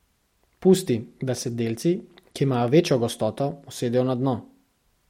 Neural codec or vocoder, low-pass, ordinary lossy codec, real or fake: none; 19.8 kHz; MP3, 64 kbps; real